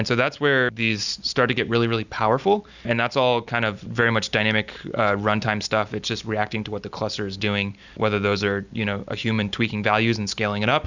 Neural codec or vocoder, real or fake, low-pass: none; real; 7.2 kHz